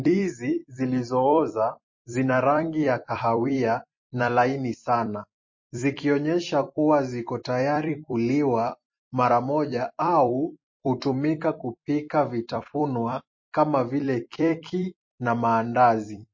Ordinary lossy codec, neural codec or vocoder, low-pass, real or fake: MP3, 32 kbps; vocoder, 44.1 kHz, 128 mel bands every 256 samples, BigVGAN v2; 7.2 kHz; fake